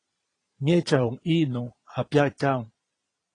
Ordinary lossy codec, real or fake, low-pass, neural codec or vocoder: AAC, 32 kbps; fake; 9.9 kHz; vocoder, 22.05 kHz, 80 mel bands, Vocos